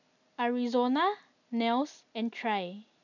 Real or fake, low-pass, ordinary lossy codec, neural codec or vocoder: real; 7.2 kHz; none; none